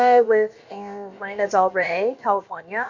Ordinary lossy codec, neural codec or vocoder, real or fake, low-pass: MP3, 48 kbps; codec, 16 kHz, about 1 kbps, DyCAST, with the encoder's durations; fake; 7.2 kHz